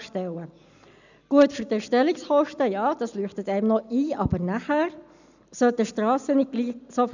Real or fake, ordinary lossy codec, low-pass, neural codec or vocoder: real; none; 7.2 kHz; none